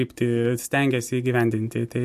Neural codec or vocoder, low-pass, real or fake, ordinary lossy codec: none; 14.4 kHz; real; MP3, 64 kbps